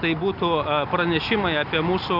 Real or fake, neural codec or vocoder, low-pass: real; none; 5.4 kHz